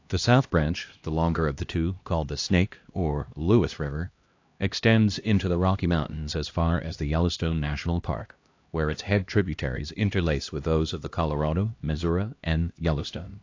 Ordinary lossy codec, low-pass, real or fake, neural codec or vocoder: AAC, 48 kbps; 7.2 kHz; fake; codec, 16 kHz, 1 kbps, X-Codec, HuBERT features, trained on LibriSpeech